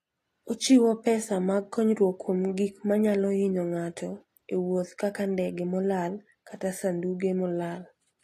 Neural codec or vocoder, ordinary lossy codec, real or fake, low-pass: none; AAC, 48 kbps; real; 14.4 kHz